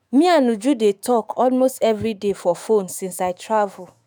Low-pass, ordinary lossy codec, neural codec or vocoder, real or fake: none; none; autoencoder, 48 kHz, 128 numbers a frame, DAC-VAE, trained on Japanese speech; fake